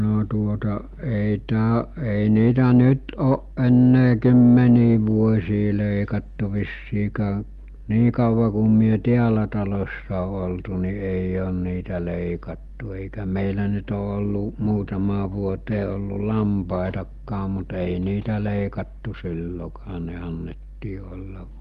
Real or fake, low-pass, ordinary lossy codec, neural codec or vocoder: real; 14.4 kHz; Opus, 32 kbps; none